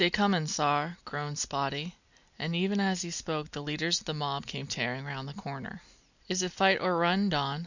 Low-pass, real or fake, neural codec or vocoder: 7.2 kHz; real; none